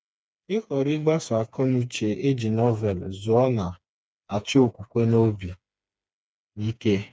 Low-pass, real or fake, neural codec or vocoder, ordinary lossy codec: none; fake; codec, 16 kHz, 4 kbps, FreqCodec, smaller model; none